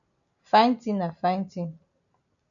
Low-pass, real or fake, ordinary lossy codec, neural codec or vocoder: 7.2 kHz; real; MP3, 96 kbps; none